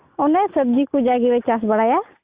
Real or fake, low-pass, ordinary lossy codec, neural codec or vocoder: real; 3.6 kHz; Opus, 64 kbps; none